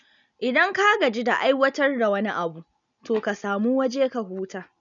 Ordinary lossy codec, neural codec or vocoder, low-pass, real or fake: none; none; 7.2 kHz; real